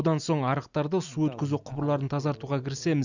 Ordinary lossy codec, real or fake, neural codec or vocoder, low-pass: none; real; none; 7.2 kHz